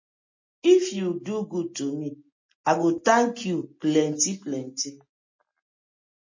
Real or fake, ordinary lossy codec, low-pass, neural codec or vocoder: real; MP3, 32 kbps; 7.2 kHz; none